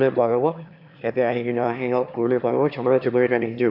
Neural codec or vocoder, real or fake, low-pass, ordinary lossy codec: autoencoder, 22.05 kHz, a latent of 192 numbers a frame, VITS, trained on one speaker; fake; 5.4 kHz; AAC, 48 kbps